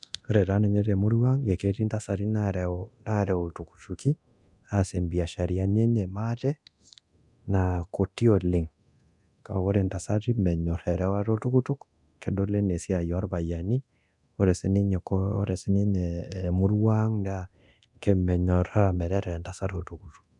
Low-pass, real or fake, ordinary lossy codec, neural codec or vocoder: 10.8 kHz; fake; none; codec, 24 kHz, 0.9 kbps, DualCodec